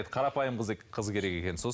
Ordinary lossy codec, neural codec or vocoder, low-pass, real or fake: none; none; none; real